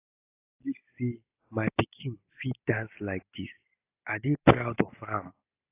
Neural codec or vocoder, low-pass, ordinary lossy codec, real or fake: none; 3.6 kHz; AAC, 24 kbps; real